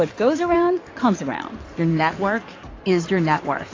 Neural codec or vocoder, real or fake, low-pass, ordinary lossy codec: codec, 16 kHz in and 24 kHz out, 2.2 kbps, FireRedTTS-2 codec; fake; 7.2 kHz; AAC, 32 kbps